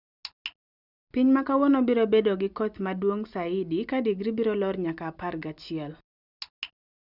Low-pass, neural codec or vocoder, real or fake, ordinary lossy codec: 5.4 kHz; none; real; none